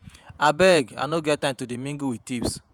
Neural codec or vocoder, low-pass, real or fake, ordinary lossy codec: vocoder, 48 kHz, 128 mel bands, Vocos; 19.8 kHz; fake; none